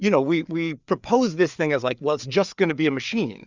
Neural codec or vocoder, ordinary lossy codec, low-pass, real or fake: codec, 16 kHz, 4 kbps, FreqCodec, larger model; Opus, 64 kbps; 7.2 kHz; fake